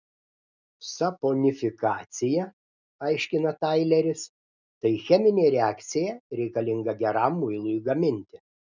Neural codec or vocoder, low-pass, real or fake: none; 7.2 kHz; real